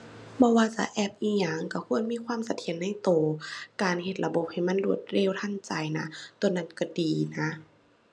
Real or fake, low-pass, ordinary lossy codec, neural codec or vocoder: real; none; none; none